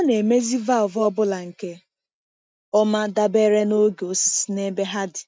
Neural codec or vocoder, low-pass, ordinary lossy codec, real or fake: none; none; none; real